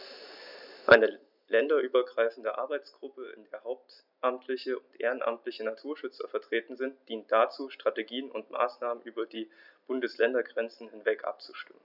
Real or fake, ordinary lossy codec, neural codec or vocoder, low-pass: real; none; none; 5.4 kHz